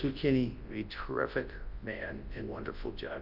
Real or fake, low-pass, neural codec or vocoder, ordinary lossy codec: fake; 5.4 kHz; codec, 24 kHz, 0.9 kbps, WavTokenizer, large speech release; Opus, 24 kbps